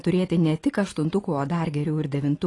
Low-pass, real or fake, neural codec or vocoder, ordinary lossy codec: 10.8 kHz; real; none; AAC, 32 kbps